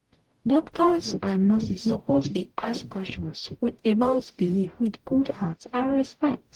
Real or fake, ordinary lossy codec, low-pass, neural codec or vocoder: fake; Opus, 24 kbps; 19.8 kHz; codec, 44.1 kHz, 0.9 kbps, DAC